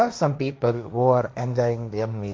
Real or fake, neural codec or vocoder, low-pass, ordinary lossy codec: fake; codec, 16 kHz, 1.1 kbps, Voila-Tokenizer; none; none